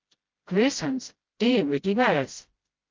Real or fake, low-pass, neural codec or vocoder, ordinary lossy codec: fake; 7.2 kHz; codec, 16 kHz, 0.5 kbps, FreqCodec, smaller model; Opus, 32 kbps